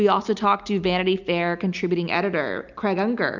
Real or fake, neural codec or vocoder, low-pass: real; none; 7.2 kHz